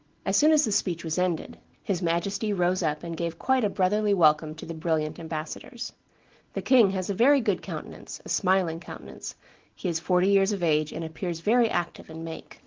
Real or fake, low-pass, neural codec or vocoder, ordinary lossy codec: real; 7.2 kHz; none; Opus, 16 kbps